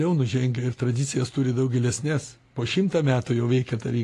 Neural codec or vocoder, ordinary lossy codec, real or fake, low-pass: none; AAC, 48 kbps; real; 14.4 kHz